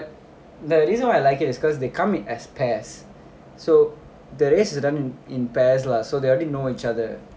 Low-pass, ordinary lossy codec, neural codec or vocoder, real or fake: none; none; none; real